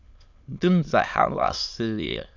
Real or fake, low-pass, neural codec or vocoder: fake; 7.2 kHz; autoencoder, 22.05 kHz, a latent of 192 numbers a frame, VITS, trained on many speakers